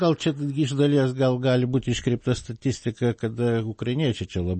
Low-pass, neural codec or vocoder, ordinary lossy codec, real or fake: 9.9 kHz; none; MP3, 32 kbps; real